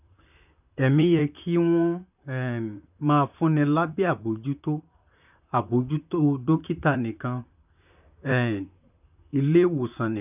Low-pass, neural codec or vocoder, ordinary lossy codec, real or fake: 3.6 kHz; vocoder, 44.1 kHz, 128 mel bands, Pupu-Vocoder; none; fake